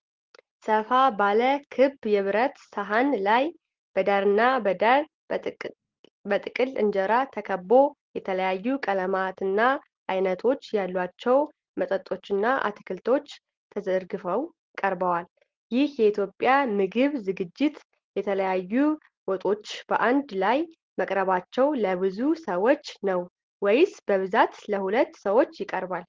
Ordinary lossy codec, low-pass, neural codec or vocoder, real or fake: Opus, 16 kbps; 7.2 kHz; none; real